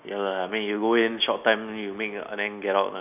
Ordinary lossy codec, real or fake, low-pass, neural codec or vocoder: none; real; 3.6 kHz; none